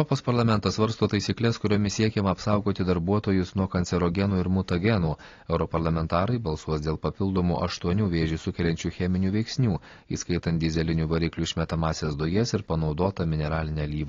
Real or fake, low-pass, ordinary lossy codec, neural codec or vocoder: real; 7.2 kHz; AAC, 32 kbps; none